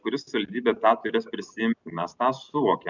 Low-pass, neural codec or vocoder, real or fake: 7.2 kHz; none; real